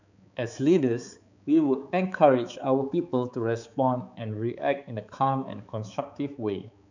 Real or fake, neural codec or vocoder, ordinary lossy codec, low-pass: fake; codec, 16 kHz, 4 kbps, X-Codec, HuBERT features, trained on balanced general audio; none; 7.2 kHz